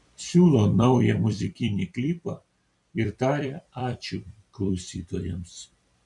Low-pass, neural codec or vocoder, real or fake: 10.8 kHz; vocoder, 44.1 kHz, 128 mel bands every 256 samples, BigVGAN v2; fake